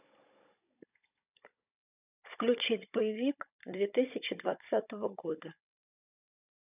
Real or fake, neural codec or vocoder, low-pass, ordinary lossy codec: fake; codec, 16 kHz, 16 kbps, FunCodec, trained on Chinese and English, 50 frames a second; 3.6 kHz; none